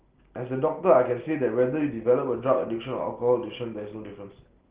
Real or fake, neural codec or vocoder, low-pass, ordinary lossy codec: real; none; 3.6 kHz; Opus, 16 kbps